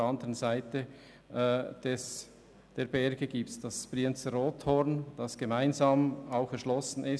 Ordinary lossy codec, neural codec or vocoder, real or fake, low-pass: none; none; real; none